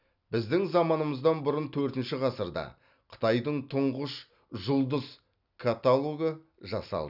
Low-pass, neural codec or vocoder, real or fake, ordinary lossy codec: 5.4 kHz; none; real; none